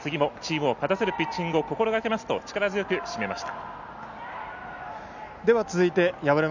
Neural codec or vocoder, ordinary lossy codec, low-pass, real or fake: none; none; 7.2 kHz; real